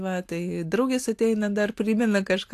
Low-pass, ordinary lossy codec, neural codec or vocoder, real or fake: 14.4 kHz; AAC, 64 kbps; none; real